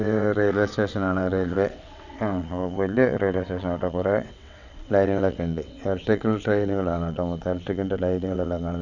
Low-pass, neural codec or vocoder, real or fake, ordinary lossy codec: 7.2 kHz; vocoder, 22.05 kHz, 80 mel bands, WaveNeXt; fake; none